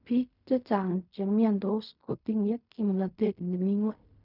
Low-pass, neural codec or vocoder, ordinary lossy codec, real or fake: 5.4 kHz; codec, 16 kHz in and 24 kHz out, 0.4 kbps, LongCat-Audio-Codec, fine tuned four codebook decoder; none; fake